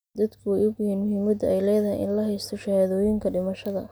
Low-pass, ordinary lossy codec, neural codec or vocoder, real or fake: none; none; none; real